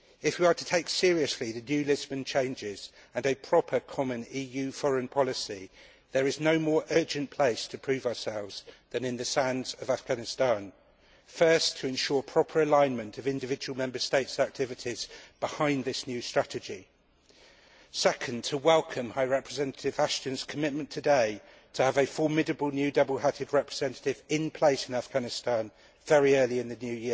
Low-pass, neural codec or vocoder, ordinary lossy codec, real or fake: none; none; none; real